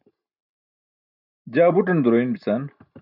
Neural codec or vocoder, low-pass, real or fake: none; 5.4 kHz; real